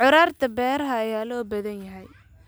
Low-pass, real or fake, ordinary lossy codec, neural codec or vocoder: none; real; none; none